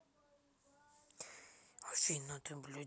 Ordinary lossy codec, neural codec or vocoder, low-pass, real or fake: none; none; none; real